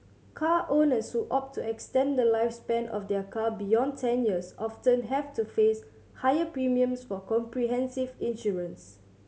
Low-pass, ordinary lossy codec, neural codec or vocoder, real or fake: none; none; none; real